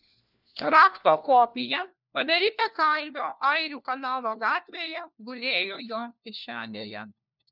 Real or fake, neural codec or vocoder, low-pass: fake; codec, 16 kHz, 1 kbps, FunCodec, trained on LibriTTS, 50 frames a second; 5.4 kHz